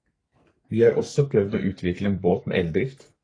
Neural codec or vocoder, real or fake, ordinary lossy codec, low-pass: codec, 44.1 kHz, 2.6 kbps, SNAC; fake; AAC, 32 kbps; 9.9 kHz